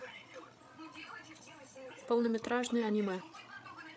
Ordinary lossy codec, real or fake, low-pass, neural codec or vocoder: none; fake; none; codec, 16 kHz, 16 kbps, FreqCodec, larger model